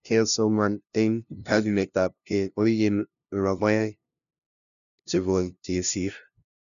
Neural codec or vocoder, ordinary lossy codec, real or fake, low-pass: codec, 16 kHz, 0.5 kbps, FunCodec, trained on LibriTTS, 25 frames a second; none; fake; 7.2 kHz